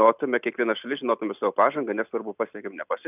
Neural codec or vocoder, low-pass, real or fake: none; 3.6 kHz; real